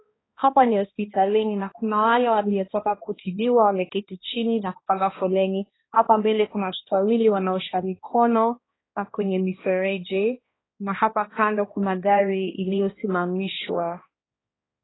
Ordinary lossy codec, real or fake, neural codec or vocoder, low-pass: AAC, 16 kbps; fake; codec, 16 kHz, 1 kbps, X-Codec, HuBERT features, trained on balanced general audio; 7.2 kHz